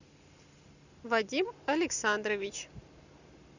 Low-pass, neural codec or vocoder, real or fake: 7.2 kHz; none; real